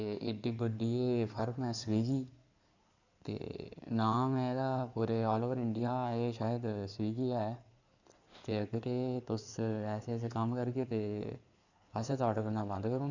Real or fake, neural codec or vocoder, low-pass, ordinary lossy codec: fake; codec, 16 kHz in and 24 kHz out, 2.2 kbps, FireRedTTS-2 codec; 7.2 kHz; none